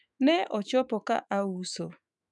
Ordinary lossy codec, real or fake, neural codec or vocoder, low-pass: none; fake; autoencoder, 48 kHz, 128 numbers a frame, DAC-VAE, trained on Japanese speech; 10.8 kHz